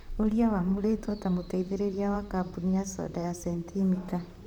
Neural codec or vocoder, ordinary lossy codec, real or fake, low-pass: vocoder, 44.1 kHz, 128 mel bands, Pupu-Vocoder; none; fake; 19.8 kHz